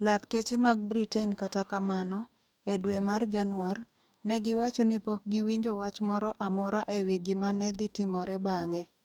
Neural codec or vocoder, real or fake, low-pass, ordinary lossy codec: codec, 44.1 kHz, 2.6 kbps, DAC; fake; 19.8 kHz; none